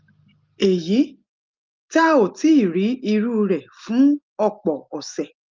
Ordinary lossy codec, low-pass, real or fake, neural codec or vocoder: Opus, 32 kbps; 7.2 kHz; real; none